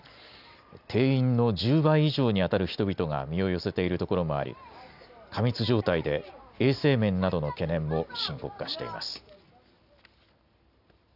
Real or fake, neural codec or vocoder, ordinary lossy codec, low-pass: real; none; none; 5.4 kHz